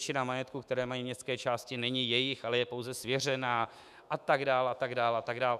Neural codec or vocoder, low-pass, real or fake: autoencoder, 48 kHz, 128 numbers a frame, DAC-VAE, trained on Japanese speech; 14.4 kHz; fake